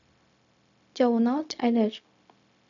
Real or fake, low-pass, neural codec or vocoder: fake; 7.2 kHz; codec, 16 kHz, 0.4 kbps, LongCat-Audio-Codec